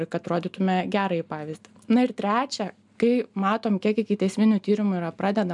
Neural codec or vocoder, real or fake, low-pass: none; real; 10.8 kHz